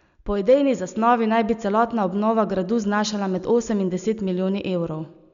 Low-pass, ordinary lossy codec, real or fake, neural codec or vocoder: 7.2 kHz; none; real; none